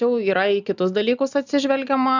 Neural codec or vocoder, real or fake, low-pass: none; real; 7.2 kHz